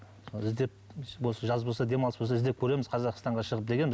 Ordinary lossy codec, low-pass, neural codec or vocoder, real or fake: none; none; none; real